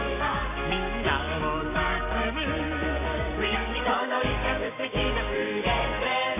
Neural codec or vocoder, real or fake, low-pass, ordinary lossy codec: codec, 16 kHz, 6 kbps, DAC; fake; 3.6 kHz; none